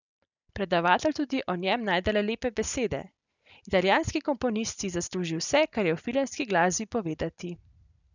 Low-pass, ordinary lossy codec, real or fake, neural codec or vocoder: 7.2 kHz; none; real; none